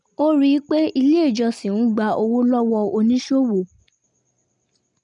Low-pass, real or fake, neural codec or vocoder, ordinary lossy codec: 10.8 kHz; real; none; none